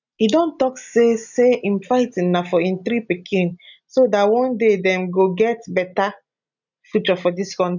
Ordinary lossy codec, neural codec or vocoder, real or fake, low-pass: none; none; real; 7.2 kHz